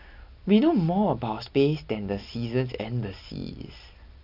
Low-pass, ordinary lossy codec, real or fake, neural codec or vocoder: 5.4 kHz; none; real; none